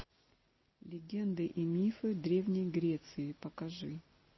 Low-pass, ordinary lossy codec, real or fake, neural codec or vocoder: 7.2 kHz; MP3, 24 kbps; real; none